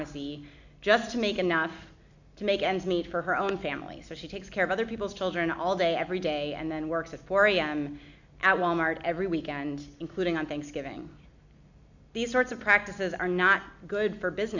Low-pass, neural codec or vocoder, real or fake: 7.2 kHz; none; real